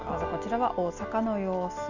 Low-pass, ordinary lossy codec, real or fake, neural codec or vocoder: 7.2 kHz; none; real; none